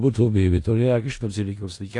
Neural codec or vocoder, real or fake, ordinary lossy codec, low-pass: codec, 16 kHz in and 24 kHz out, 0.4 kbps, LongCat-Audio-Codec, four codebook decoder; fake; AAC, 48 kbps; 10.8 kHz